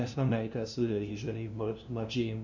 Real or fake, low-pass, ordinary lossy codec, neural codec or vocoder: fake; 7.2 kHz; none; codec, 16 kHz, 0.5 kbps, FunCodec, trained on LibriTTS, 25 frames a second